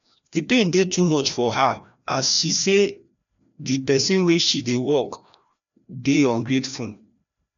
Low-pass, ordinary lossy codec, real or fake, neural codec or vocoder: 7.2 kHz; none; fake; codec, 16 kHz, 1 kbps, FreqCodec, larger model